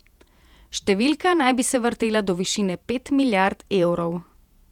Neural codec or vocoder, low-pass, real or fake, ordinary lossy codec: vocoder, 48 kHz, 128 mel bands, Vocos; 19.8 kHz; fake; none